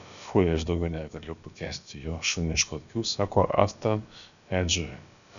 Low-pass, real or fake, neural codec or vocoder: 7.2 kHz; fake; codec, 16 kHz, about 1 kbps, DyCAST, with the encoder's durations